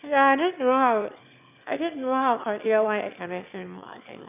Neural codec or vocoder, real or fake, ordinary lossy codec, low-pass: autoencoder, 22.05 kHz, a latent of 192 numbers a frame, VITS, trained on one speaker; fake; none; 3.6 kHz